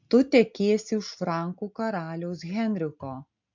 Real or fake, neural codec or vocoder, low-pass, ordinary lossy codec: real; none; 7.2 kHz; MP3, 64 kbps